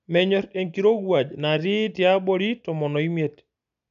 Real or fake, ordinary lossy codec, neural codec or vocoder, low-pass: real; none; none; 7.2 kHz